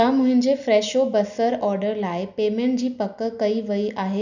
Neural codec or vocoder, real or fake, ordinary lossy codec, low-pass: none; real; none; 7.2 kHz